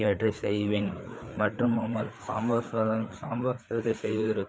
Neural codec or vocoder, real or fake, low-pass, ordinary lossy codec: codec, 16 kHz, 4 kbps, FreqCodec, larger model; fake; none; none